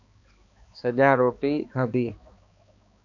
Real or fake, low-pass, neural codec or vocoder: fake; 7.2 kHz; codec, 16 kHz, 2 kbps, X-Codec, HuBERT features, trained on balanced general audio